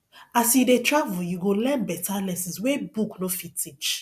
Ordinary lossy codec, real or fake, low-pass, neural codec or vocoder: none; real; 14.4 kHz; none